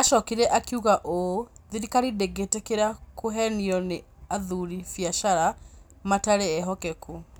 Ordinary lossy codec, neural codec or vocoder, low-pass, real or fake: none; none; none; real